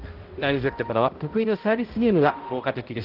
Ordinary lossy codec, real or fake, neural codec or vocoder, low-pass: Opus, 16 kbps; fake; codec, 16 kHz, 0.5 kbps, X-Codec, HuBERT features, trained on balanced general audio; 5.4 kHz